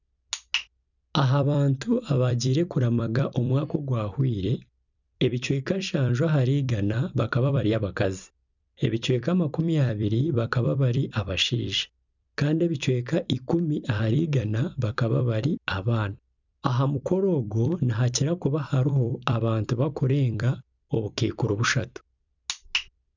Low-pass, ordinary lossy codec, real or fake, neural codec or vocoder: 7.2 kHz; none; fake; vocoder, 44.1 kHz, 128 mel bands every 256 samples, BigVGAN v2